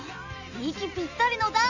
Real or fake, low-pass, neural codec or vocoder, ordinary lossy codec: real; 7.2 kHz; none; none